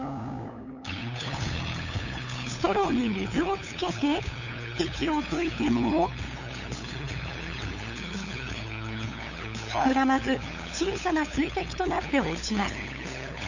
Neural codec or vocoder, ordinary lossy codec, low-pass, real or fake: codec, 16 kHz, 8 kbps, FunCodec, trained on LibriTTS, 25 frames a second; none; 7.2 kHz; fake